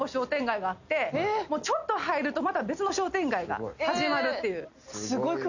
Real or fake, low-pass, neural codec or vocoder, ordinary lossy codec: real; 7.2 kHz; none; none